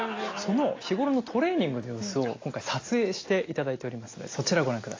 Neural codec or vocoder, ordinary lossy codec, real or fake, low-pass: none; AAC, 32 kbps; real; 7.2 kHz